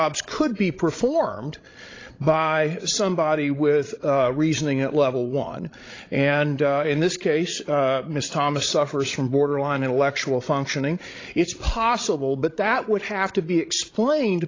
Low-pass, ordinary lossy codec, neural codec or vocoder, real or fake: 7.2 kHz; AAC, 32 kbps; codec, 16 kHz, 16 kbps, FreqCodec, larger model; fake